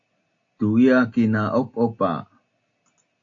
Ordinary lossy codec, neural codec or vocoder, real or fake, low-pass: AAC, 48 kbps; none; real; 7.2 kHz